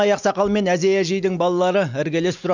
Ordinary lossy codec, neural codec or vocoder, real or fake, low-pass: none; none; real; 7.2 kHz